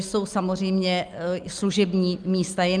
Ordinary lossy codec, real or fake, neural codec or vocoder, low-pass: Opus, 32 kbps; real; none; 9.9 kHz